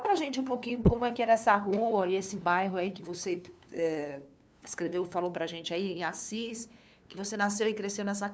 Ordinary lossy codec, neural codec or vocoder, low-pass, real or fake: none; codec, 16 kHz, 2 kbps, FunCodec, trained on LibriTTS, 25 frames a second; none; fake